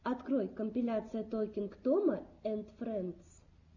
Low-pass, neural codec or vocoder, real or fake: 7.2 kHz; none; real